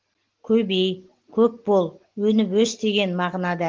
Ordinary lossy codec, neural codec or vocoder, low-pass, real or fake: Opus, 16 kbps; none; 7.2 kHz; real